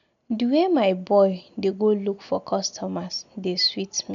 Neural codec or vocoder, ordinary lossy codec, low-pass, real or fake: none; none; 7.2 kHz; real